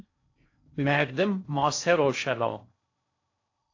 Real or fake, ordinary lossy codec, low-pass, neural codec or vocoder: fake; AAC, 48 kbps; 7.2 kHz; codec, 16 kHz in and 24 kHz out, 0.6 kbps, FocalCodec, streaming, 4096 codes